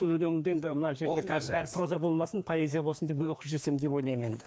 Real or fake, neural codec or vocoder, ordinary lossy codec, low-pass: fake; codec, 16 kHz, 2 kbps, FreqCodec, larger model; none; none